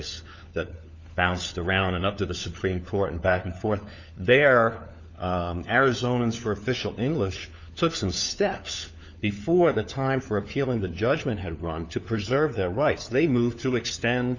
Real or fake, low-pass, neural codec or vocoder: fake; 7.2 kHz; codec, 16 kHz, 4 kbps, FunCodec, trained on LibriTTS, 50 frames a second